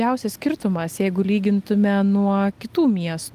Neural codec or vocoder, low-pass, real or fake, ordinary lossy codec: none; 14.4 kHz; real; Opus, 32 kbps